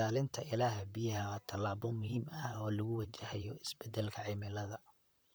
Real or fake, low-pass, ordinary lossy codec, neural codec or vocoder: fake; none; none; vocoder, 44.1 kHz, 128 mel bands, Pupu-Vocoder